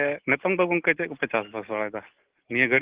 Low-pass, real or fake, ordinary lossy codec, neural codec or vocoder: 3.6 kHz; real; Opus, 16 kbps; none